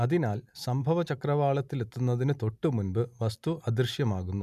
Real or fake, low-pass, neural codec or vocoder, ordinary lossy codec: real; 14.4 kHz; none; none